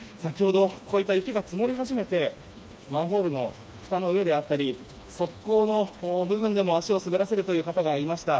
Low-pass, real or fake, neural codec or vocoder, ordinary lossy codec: none; fake; codec, 16 kHz, 2 kbps, FreqCodec, smaller model; none